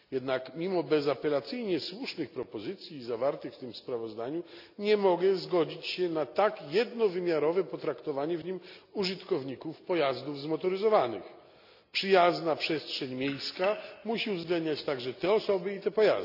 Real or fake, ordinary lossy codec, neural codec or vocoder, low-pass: real; none; none; 5.4 kHz